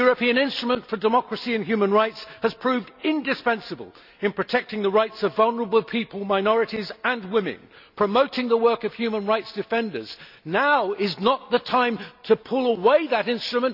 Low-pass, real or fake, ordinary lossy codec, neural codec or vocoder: 5.4 kHz; real; none; none